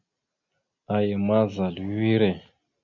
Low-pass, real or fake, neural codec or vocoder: 7.2 kHz; real; none